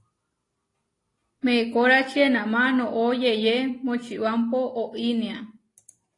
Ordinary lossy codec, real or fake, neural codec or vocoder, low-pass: AAC, 32 kbps; real; none; 10.8 kHz